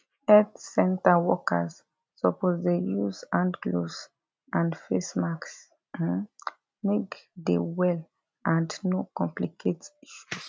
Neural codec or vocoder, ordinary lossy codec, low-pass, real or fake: none; none; none; real